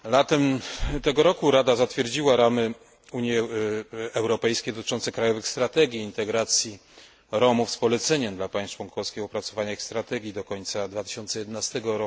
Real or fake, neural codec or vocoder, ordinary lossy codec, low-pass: real; none; none; none